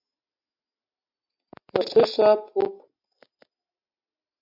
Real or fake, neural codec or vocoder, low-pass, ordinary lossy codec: real; none; 5.4 kHz; MP3, 48 kbps